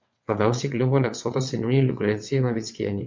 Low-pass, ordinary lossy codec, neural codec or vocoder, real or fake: 7.2 kHz; MP3, 48 kbps; vocoder, 22.05 kHz, 80 mel bands, WaveNeXt; fake